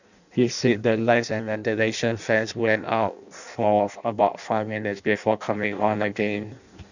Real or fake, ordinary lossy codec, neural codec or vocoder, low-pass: fake; none; codec, 16 kHz in and 24 kHz out, 0.6 kbps, FireRedTTS-2 codec; 7.2 kHz